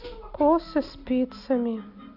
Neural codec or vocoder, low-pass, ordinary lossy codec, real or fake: none; 5.4 kHz; none; real